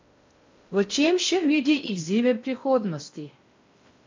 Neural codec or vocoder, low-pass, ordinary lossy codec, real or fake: codec, 16 kHz in and 24 kHz out, 0.6 kbps, FocalCodec, streaming, 4096 codes; 7.2 kHz; MP3, 64 kbps; fake